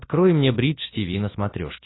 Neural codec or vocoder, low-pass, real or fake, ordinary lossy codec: none; 7.2 kHz; real; AAC, 16 kbps